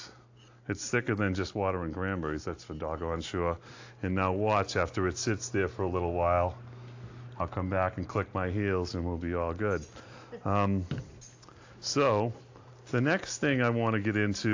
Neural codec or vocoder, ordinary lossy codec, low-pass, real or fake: none; AAC, 48 kbps; 7.2 kHz; real